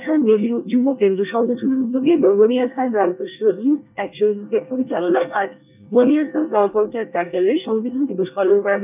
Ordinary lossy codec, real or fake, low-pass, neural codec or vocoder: none; fake; 3.6 kHz; codec, 24 kHz, 1 kbps, SNAC